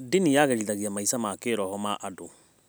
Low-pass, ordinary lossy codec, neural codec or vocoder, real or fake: none; none; none; real